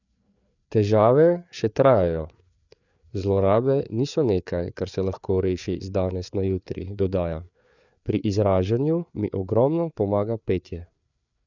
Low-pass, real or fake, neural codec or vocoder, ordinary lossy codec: 7.2 kHz; fake; codec, 16 kHz, 4 kbps, FreqCodec, larger model; none